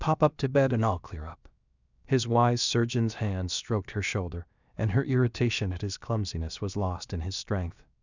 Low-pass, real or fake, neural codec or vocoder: 7.2 kHz; fake; codec, 16 kHz, about 1 kbps, DyCAST, with the encoder's durations